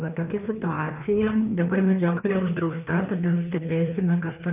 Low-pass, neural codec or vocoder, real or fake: 3.6 kHz; codec, 16 kHz, 2 kbps, FreqCodec, larger model; fake